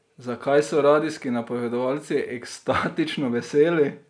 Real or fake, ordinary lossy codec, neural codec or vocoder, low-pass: real; none; none; 9.9 kHz